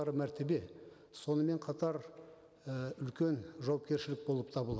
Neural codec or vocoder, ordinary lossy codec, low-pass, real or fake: none; none; none; real